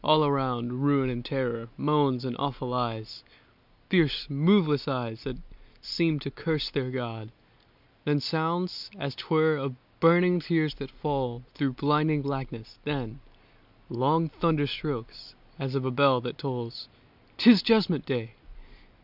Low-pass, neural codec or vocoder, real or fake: 5.4 kHz; none; real